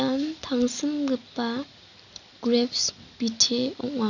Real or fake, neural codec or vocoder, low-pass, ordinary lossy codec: real; none; 7.2 kHz; none